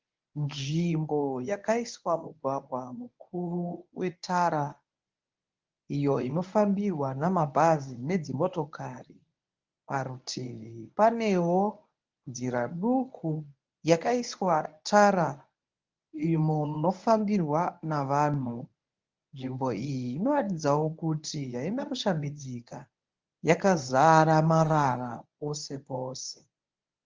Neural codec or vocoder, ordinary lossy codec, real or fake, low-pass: codec, 24 kHz, 0.9 kbps, WavTokenizer, medium speech release version 1; Opus, 24 kbps; fake; 7.2 kHz